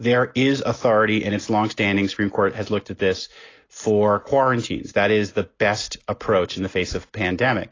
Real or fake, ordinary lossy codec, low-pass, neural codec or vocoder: real; AAC, 32 kbps; 7.2 kHz; none